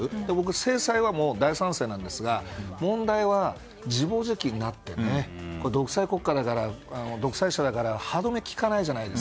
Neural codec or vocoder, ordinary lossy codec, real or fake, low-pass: none; none; real; none